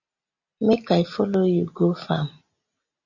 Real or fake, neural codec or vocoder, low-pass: real; none; 7.2 kHz